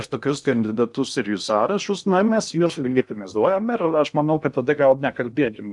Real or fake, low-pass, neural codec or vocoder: fake; 10.8 kHz; codec, 16 kHz in and 24 kHz out, 0.6 kbps, FocalCodec, streaming, 2048 codes